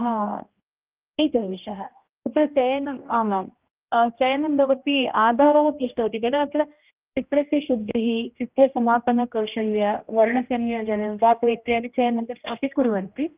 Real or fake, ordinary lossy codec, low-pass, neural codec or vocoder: fake; Opus, 24 kbps; 3.6 kHz; codec, 16 kHz, 1 kbps, X-Codec, HuBERT features, trained on general audio